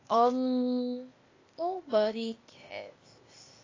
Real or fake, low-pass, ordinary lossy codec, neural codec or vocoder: fake; 7.2 kHz; AAC, 32 kbps; codec, 16 kHz, 0.8 kbps, ZipCodec